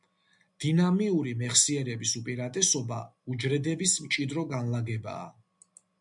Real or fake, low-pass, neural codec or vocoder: real; 10.8 kHz; none